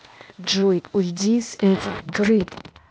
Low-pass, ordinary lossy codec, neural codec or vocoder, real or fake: none; none; codec, 16 kHz, 0.8 kbps, ZipCodec; fake